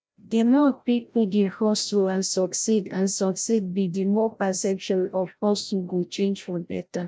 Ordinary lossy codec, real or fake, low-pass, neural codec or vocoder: none; fake; none; codec, 16 kHz, 0.5 kbps, FreqCodec, larger model